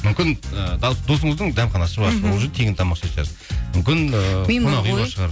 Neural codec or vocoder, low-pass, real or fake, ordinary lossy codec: none; none; real; none